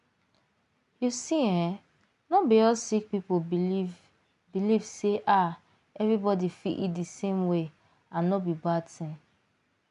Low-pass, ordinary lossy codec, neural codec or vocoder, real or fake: 10.8 kHz; none; none; real